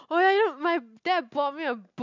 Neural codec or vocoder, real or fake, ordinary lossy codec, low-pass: none; real; none; 7.2 kHz